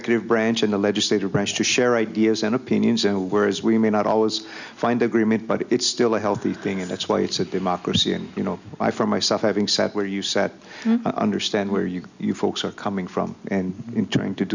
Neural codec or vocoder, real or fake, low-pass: none; real; 7.2 kHz